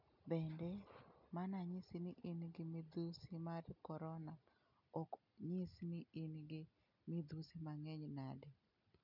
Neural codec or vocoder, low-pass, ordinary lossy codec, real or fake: none; 5.4 kHz; none; real